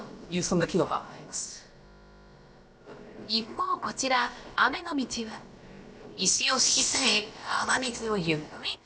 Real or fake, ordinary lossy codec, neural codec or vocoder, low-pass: fake; none; codec, 16 kHz, about 1 kbps, DyCAST, with the encoder's durations; none